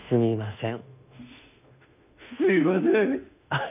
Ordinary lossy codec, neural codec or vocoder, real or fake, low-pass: none; autoencoder, 48 kHz, 32 numbers a frame, DAC-VAE, trained on Japanese speech; fake; 3.6 kHz